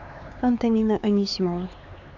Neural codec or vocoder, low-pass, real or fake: codec, 16 kHz, 4 kbps, X-Codec, HuBERT features, trained on LibriSpeech; 7.2 kHz; fake